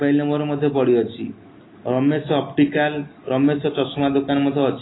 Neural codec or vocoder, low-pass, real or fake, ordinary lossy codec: none; 7.2 kHz; real; AAC, 16 kbps